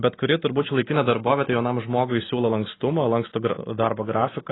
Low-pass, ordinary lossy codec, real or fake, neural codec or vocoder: 7.2 kHz; AAC, 16 kbps; real; none